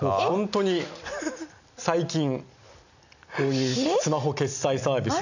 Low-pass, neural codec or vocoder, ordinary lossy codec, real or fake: 7.2 kHz; none; none; real